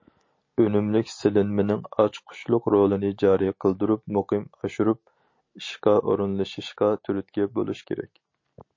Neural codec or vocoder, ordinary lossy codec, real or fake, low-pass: none; MP3, 32 kbps; real; 7.2 kHz